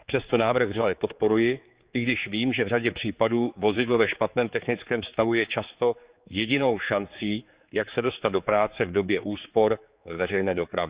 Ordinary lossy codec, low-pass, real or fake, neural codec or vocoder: Opus, 64 kbps; 3.6 kHz; fake; codec, 16 kHz, 4 kbps, X-Codec, HuBERT features, trained on general audio